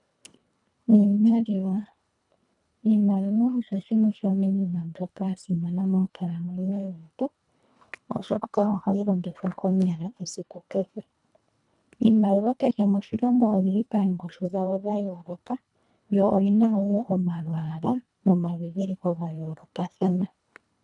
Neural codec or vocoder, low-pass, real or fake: codec, 24 kHz, 1.5 kbps, HILCodec; 10.8 kHz; fake